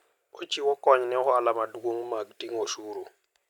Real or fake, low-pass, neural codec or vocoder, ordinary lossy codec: real; 19.8 kHz; none; none